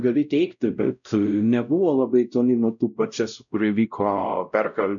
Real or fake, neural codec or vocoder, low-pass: fake; codec, 16 kHz, 0.5 kbps, X-Codec, WavLM features, trained on Multilingual LibriSpeech; 7.2 kHz